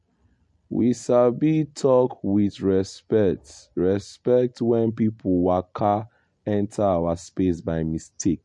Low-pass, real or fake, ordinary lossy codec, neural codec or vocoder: 10.8 kHz; real; MP3, 48 kbps; none